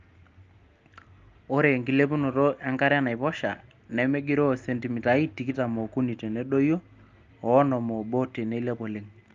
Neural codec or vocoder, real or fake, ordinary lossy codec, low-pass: none; real; Opus, 32 kbps; 7.2 kHz